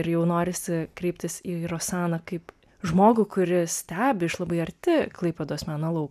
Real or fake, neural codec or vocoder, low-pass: real; none; 14.4 kHz